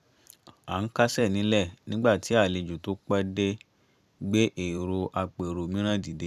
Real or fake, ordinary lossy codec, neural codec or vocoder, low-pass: fake; none; vocoder, 44.1 kHz, 128 mel bands every 256 samples, BigVGAN v2; 14.4 kHz